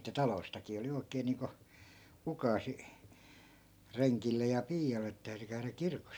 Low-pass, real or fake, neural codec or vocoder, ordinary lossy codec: none; real; none; none